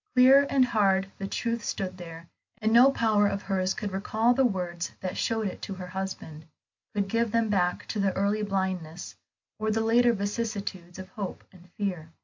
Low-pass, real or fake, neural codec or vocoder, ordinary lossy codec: 7.2 kHz; real; none; MP3, 48 kbps